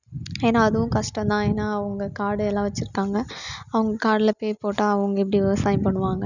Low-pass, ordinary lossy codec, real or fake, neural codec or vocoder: 7.2 kHz; none; real; none